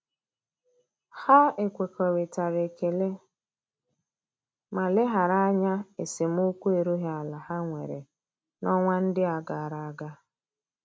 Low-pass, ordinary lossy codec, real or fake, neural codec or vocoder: none; none; real; none